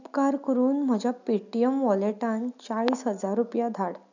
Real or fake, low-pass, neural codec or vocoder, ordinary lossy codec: real; 7.2 kHz; none; none